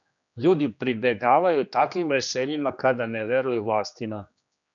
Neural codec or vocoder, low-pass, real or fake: codec, 16 kHz, 2 kbps, X-Codec, HuBERT features, trained on general audio; 7.2 kHz; fake